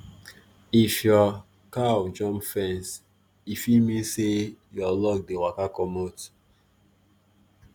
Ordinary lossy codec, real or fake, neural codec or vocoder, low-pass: none; real; none; none